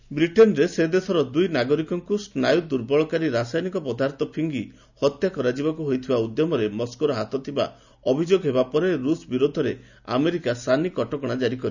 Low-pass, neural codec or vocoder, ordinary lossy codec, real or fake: 7.2 kHz; none; none; real